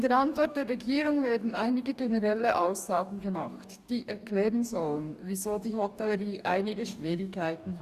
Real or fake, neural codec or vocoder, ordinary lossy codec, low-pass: fake; codec, 44.1 kHz, 2.6 kbps, DAC; Opus, 64 kbps; 14.4 kHz